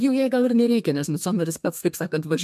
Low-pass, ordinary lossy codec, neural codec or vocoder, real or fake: 14.4 kHz; AAC, 96 kbps; codec, 32 kHz, 1.9 kbps, SNAC; fake